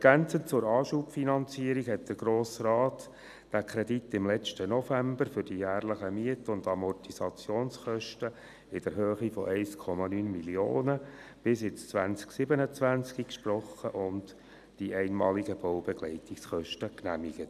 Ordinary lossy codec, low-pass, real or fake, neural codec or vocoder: none; 14.4 kHz; real; none